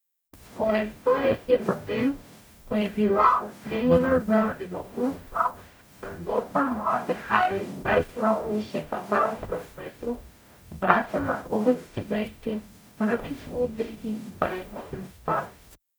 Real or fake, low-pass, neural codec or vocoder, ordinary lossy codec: fake; none; codec, 44.1 kHz, 0.9 kbps, DAC; none